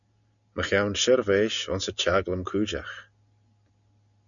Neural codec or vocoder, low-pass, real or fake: none; 7.2 kHz; real